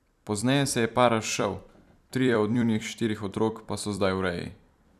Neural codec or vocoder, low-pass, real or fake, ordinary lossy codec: vocoder, 44.1 kHz, 128 mel bands every 256 samples, BigVGAN v2; 14.4 kHz; fake; none